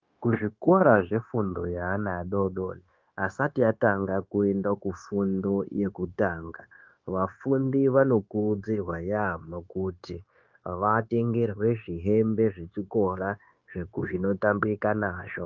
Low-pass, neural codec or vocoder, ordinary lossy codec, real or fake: 7.2 kHz; codec, 16 kHz, 0.9 kbps, LongCat-Audio-Codec; Opus, 24 kbps; fake